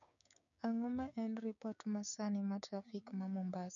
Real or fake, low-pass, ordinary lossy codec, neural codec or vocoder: fake; 7.2 kHz; none; codec, 16 kHz, 6 kbps, DAC